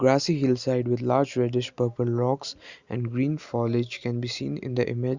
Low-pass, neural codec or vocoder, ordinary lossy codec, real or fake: 7.2 kHz; none; Opus, 64 kbps; real